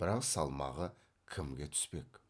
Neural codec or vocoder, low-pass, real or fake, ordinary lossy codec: none; none; real; none